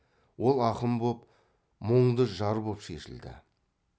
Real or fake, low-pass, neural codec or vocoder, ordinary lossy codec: real; none; none; none